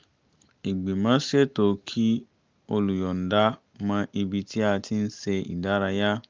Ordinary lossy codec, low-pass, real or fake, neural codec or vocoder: Opus, 32 kbps; 7.2 kHz; real; none